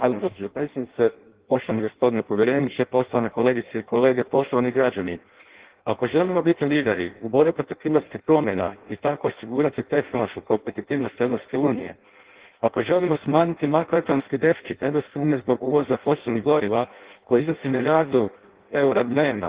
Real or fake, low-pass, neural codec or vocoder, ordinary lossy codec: fake; 3.6 kHz; codec, 16 kHz in and 24 kHz out, 0.6 kbps, FireRedTTS-2 codec; Opus, 16 kbps